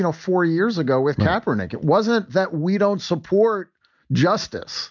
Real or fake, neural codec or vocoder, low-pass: real; none; 7.2 kHz